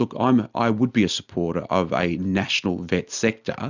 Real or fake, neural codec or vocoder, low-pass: real; none; 7.2 kHz